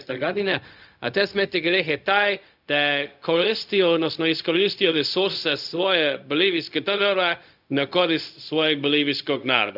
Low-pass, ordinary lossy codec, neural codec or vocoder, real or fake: 5.4 kHz; none; codec, 16 kHz, 0.4 kbps, LongCat-Audio-Codec; fake